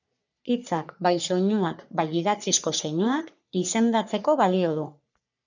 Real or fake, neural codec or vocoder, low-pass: fake; codec, 44.1 kHz, 3.4 kbps, Pupu-Codec; 7.2 kHz